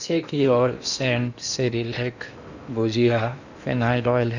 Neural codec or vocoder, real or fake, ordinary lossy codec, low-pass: codec, 16 kHz in and 24 kHz out, 0.8 kbps, FocalCodec, streaming, 65536 codes; fake; Opus, 64 kbps; 7.2 kHz